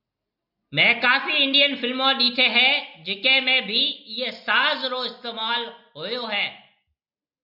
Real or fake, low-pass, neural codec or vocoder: real; 5.4 kHz; none